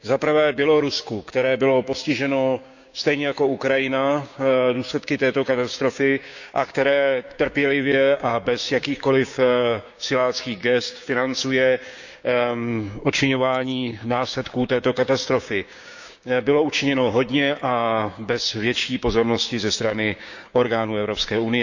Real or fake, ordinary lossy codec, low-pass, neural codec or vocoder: fake; none; 7.2 kHz; codec, 16 kHz, 6 kbps, DAC